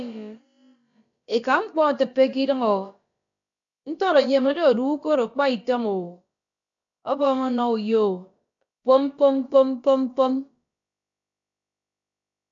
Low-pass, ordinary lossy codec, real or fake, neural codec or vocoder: 7.2 kHz; AAC, 64 kbps; fake; codec, 16 kHz, about 1 kbps, DyCAST, with the encoder's durations